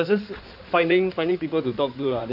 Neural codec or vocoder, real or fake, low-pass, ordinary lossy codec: codec, 16 kHz in and 24 kHz out, 2.2 kbps, FireRedTTS-2 codec; fake; 5.4 kHz; none